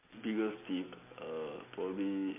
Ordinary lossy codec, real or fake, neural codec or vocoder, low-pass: MP3, 24 kbps; real; none; 3.6 kHz